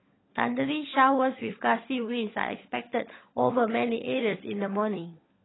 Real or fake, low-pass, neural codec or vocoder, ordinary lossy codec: fake; 7.2 kHz; codec, 16 kHz, 16 kbps, FunCodec, trained on LibriTTS, 50 frames a second; AAC, 16 kbps